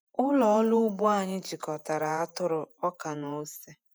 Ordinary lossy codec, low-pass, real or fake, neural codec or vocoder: none; none; fake; vocoder, 48 kHz, 128 mel bands, Vocos